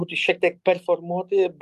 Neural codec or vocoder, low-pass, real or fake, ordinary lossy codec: none; 14.4 kHz; real; Opus, 32 kbps